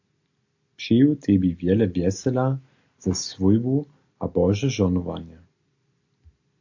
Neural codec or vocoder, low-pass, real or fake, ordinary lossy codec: none; 7.2 kHz; real; AAC, 48 kbps